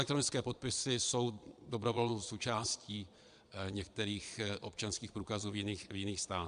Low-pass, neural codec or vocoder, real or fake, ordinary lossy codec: 9.9 kHz; vocoder, 22.05 kHz, 80 mel bands, Vocos; fake; AAC, 96 kbps